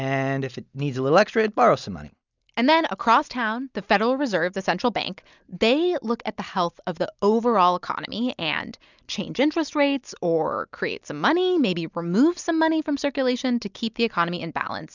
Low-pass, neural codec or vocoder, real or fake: 7.2 kHz; none; real